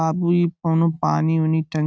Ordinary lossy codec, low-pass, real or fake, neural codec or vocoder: none; none; real; none